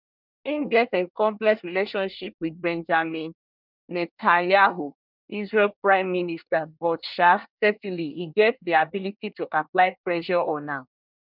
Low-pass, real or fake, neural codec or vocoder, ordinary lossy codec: 5.4 kHz; fake; codec, 24 kHz, 1 kbps, SNAC; none